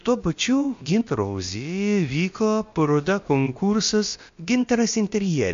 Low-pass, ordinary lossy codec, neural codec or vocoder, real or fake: 7.2 kHz; MP3, 48 kbps; codec, 16 kHz, about 1 kbps, DyCAST, with the encoder's durations; fake